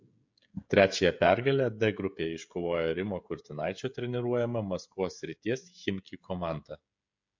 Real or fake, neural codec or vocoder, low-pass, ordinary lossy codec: fake; codec, 16 kHz, 16 kbps, FreqCodec, smaller model; 7.2 kHz; MP3, 48 kbps